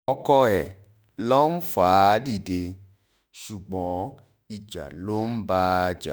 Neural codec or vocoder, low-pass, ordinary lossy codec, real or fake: autoencoder, 48 kHz, 32 numbers a frame, DAC-VAE, trained on Japanese speech; none; none; fake